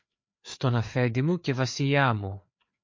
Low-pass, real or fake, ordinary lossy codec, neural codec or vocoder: 7.2 kHz; fake; MP3, 48 kbps; codec, 16 kHz, 4 kbps, FreqCodec, larger model